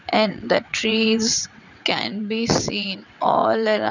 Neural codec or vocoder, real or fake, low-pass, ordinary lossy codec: vocoder, 22.05 kHz, 80 mel bands, WaveNeXt; fake; 7.2 kHz; none